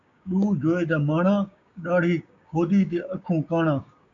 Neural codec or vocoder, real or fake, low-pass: codec, 16 kHz, 6 kbps, DAC; fake; 7.2 kHz